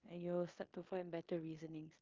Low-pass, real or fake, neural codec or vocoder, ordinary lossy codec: 7.2 kHz; fake; codec, 16 kHz in and 24 kHz out, 0.9 kbps, LongCat-Audio-Codec, four codebook decoder; Opus, 24 kbps